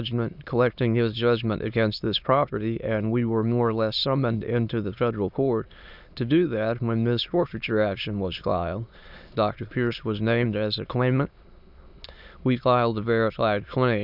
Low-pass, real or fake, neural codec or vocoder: 5.4 kHz; fake; autoencoder, 22.05 kHz, a latent of 192 numbers a frame, VITS, trained on many speakers